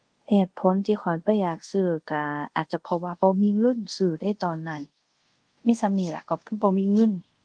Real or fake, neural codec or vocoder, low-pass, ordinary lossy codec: fake; codec, 24 kHz, 0.5 kbps, DualCodec; 9.9 kHz; Opus, 24 kbps